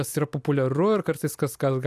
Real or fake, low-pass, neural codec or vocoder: real; 14.4 kHz; none